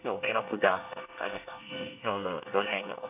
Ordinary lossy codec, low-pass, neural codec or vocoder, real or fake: AAC, 32 kbps; 3.6 kHz; codec, 24 kHz, 1 kbps, SNAC; fake